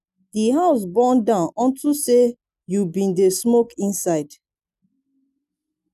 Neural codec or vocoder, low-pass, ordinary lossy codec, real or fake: none; 14.4 kHz; none; real